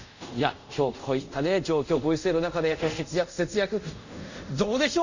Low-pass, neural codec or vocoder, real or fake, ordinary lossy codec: 7.2 kHz; codec, 24 kHz, 0.5 kbps, DualCodec; fake; none